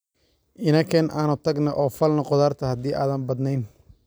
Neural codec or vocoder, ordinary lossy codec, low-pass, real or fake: none; none; none; real